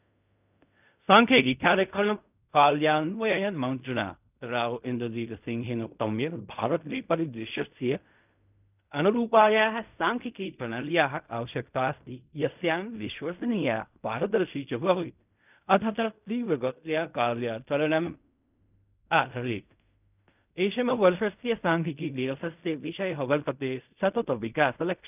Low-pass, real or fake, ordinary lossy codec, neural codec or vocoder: 3.6 kHz; fake; none; codec, 16 kHz in and 24 kHz out, 0.4 kbps, LongCat-Audio-Codec, fine tuned four codebook decoder